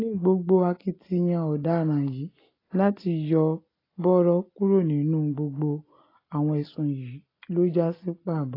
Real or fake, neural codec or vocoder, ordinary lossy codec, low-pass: real; none; AAC, 24 kbps; 5.4 kHz